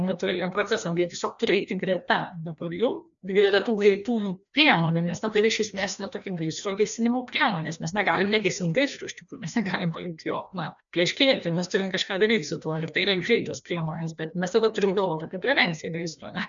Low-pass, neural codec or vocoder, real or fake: 7.2 kHz; codec, 16 kHz, 1 kbps, FreqCodec, larger model; fake